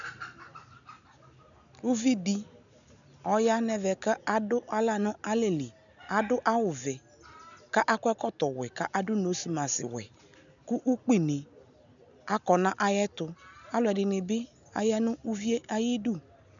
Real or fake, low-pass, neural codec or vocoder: real; 7.2 kHz; none